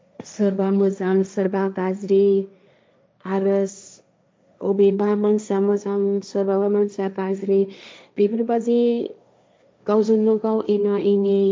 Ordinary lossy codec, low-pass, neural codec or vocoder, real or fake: none; none; codec, 16 kHz, 1.1 kbps, Voila-Tokenizer; fake